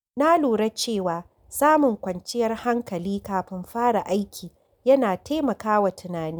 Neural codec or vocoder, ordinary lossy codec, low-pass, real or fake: none; none; none; real